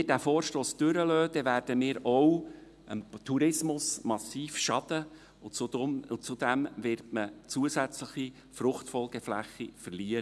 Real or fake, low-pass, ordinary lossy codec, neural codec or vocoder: real; none; none; none